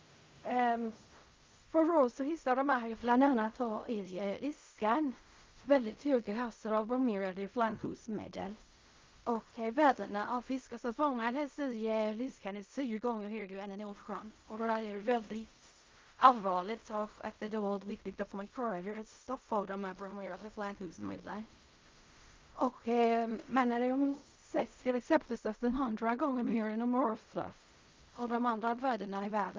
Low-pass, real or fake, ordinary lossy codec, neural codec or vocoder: 7.2 kHz; fake; Opus, 24 kbps; codec, 16 kHz in and 24 kHz out, 0.4 kbps, LongCat-Audio-Codec, fine tuned four codebook decoder